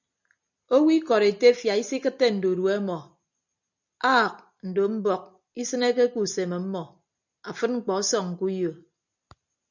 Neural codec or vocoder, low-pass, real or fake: none; 7.2 kHz; real